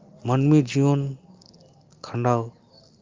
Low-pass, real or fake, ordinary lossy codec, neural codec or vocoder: 7.2 kHz; real; Opus, 32 kbps; none